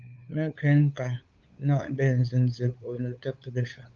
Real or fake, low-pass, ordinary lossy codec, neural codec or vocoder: fake; 7.2 kHz; Opus, 24 kbps; codec, 16 kHz, 8 kbps, FunCodec, trained on LibriTTS, 25 frames a second